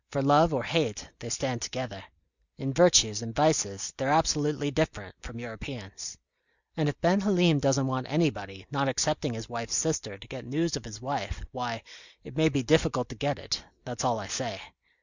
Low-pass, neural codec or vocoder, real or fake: 7.2 kHz; none; real